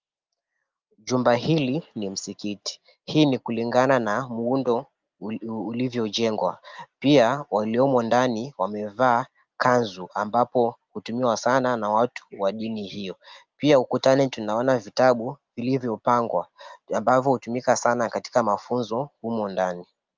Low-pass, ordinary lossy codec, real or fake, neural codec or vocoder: 7.2 kHz; Opus, 24 kbps; real; none